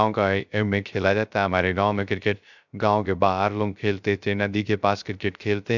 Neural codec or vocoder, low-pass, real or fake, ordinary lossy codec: codec, 16 kHz, 0.3 kbps, FocalCodec; 7.2 kHz; fake; none